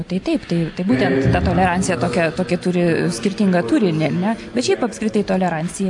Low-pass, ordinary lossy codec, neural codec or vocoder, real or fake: 10.8 kHz; AAC, 64 kbps; none; real